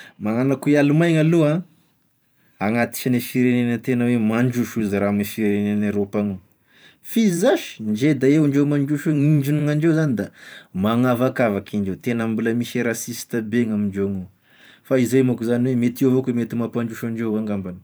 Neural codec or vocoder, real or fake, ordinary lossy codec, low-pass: vocoder, 44.1 kHz, 128 mel bands every 512 samples, BigVGAN v2; fake; none; none